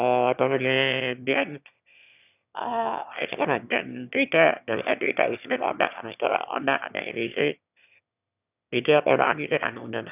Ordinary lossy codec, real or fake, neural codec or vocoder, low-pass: none; fake; autoencoder, 22.05 kHz, a latent of 192 numbers a frame, VITS, trained on one speaker; 3.6 kHz